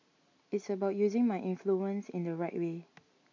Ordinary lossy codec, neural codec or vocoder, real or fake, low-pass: MP3, 48 kbps; none; real; 7.2 kHz